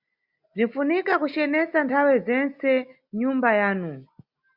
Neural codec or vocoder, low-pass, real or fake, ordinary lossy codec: none; 5.4 kHz; real; Opus, 64 kbps